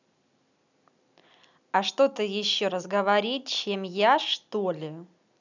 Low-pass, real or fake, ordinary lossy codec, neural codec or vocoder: 7.2 kHz; real; none; none